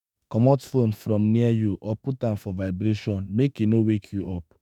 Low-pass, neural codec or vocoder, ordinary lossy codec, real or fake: 19.8 kHz; autoencoder, 48 kHz, 32 numbers a frame, DAC-VAE, trained on Japanese speech; none; fake